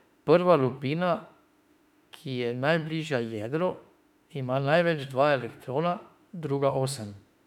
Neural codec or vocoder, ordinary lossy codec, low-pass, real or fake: autoencoder, 48 kHz, 32 numbers a frame, DAC-VAE, trained on Japanese speech; none; 19.8 kHz; fake